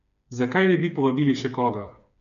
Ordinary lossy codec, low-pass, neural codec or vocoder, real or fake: none; 7.2 kHz; codec, 16 kHz, 4 kbps, FreqCodec, smaller model; fake